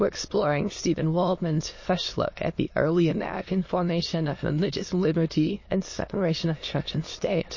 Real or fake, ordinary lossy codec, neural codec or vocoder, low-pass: fake; MP3, 32 kbps; autoencoder, 22.05 kHz, a latent of 192 numbers a frame, VITS, trained on many speakers; 7.2 kHz